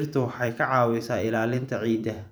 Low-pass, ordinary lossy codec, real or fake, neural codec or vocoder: none; none; fake; vocoder, 44.1 kHz, 128 mel bands every 512 samples, BigVGAN v2